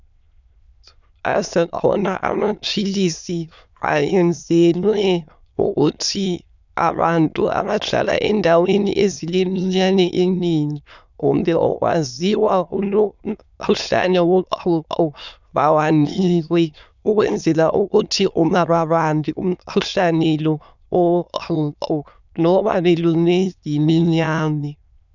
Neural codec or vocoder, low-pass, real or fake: autoencoder, 22.05 kHz, a latent of 192 numbers a frame, VITS, trained on many speakers; 7.2 kHz; fake